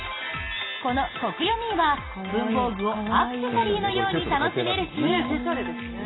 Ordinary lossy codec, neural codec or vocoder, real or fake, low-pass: AAC, 16 kbps; none; real; 7.2 kHz